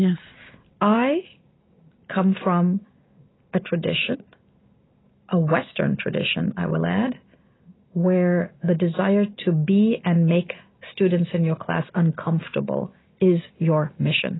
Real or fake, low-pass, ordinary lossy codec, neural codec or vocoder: real; 7.2 kHz; AAC, 16 kbps; none